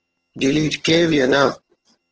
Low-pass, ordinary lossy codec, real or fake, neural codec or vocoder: 7.2 kHz; Opus, 16 kbps; fake; vocoder, 22.05 kHz, 80 mel bands, HiFi-GAN